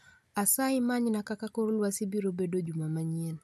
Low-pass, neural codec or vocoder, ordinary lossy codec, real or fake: 14.4 kHz; none; none; real